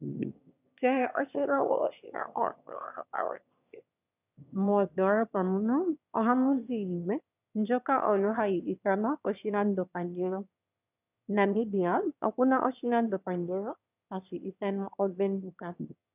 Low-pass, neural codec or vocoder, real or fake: 3.6 kHz; autoencoder, 22.05 kHz, a latent of 192 numbers a frame, VITS, trained on one speaker; fake